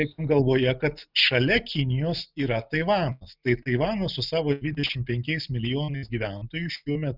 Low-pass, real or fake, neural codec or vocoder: 5.4 kHz; real; none